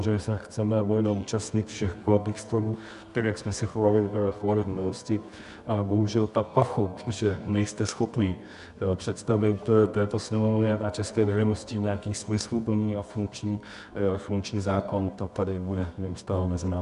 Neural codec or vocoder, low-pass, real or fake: codec, 24 kHz, 0.9 kbps, WavTokenizer, medium music audio release; 10.8 kHz; fake